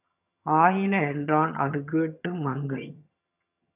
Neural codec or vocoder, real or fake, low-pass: vocoder, 22.05 kHz, 80 mel bands, HiFi-GAN; fake; 3.6 kHz